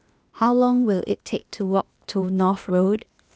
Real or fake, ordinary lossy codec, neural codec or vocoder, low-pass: fake; none; codec, 16 kHz, 0.8 kbps, ZipCodec; none